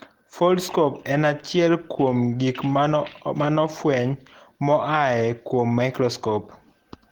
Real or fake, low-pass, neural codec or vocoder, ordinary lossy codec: real; 19.8 kHz; none; Opus, 16 kbps